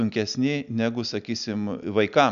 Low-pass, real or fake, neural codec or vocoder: 7.2 kHz; real; none